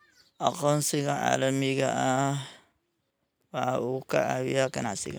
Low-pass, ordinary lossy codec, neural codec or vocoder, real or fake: none; none; none; real